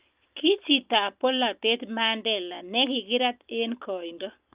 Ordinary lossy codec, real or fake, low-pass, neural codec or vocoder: Opus, 24 kbps; real; 3.6 kHz; none